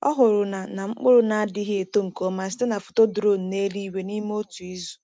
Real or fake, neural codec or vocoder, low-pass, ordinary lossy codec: real; none; none; none